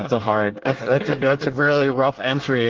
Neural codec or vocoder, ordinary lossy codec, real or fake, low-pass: codec, 24 kHz, 1 kbps, SNAC; Opus, 16 kbps; fake; 7.2 kHz